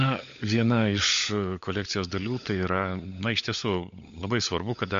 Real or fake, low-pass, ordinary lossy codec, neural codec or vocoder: fake; 7.2 kHz; MP3, 48 kbps; codec, 16 kHz, 16 kbps, FunCodec, trained on LibriTTS, 50 frames a second